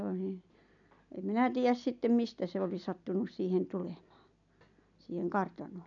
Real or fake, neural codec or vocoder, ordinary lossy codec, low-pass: real; none; none; 7.2 kHz